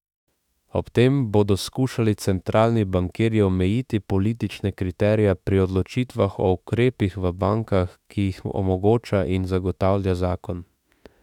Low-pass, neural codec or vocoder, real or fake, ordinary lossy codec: 19.8 kHz; autoencoder, 48 kHz, 32 numbers a frame, DAC-VAE, trained on Japanese speech; fake; none